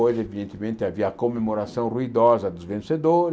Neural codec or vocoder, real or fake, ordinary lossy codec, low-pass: none; real; none; none